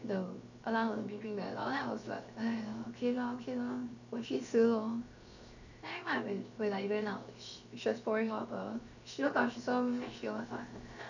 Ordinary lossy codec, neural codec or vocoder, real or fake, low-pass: none; codec, 16 kHz, 0.7 kbps, FocalCodec; fake; 7.2 kHz